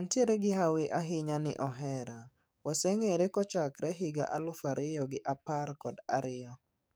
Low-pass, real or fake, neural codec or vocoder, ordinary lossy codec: none; fake; codec, 44.1 kHz, 7.8 kbps, DAC; none